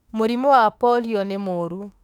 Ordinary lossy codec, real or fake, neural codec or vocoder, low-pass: none; fake; autoencoder, 48 kHz, 32 numbers a frame, DAC-VAE, trained on Japanese speech; 19.8 kHz